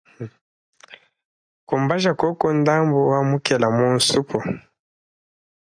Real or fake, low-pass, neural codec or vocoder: real; 9.9 kHz; none